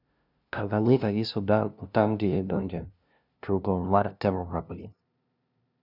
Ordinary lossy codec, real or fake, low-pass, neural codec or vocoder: none; fake; 5.4 kHz; codec, 16 kHz, 0.5 kbps, FunCodec, trained on LibriTTS, 25 frames a second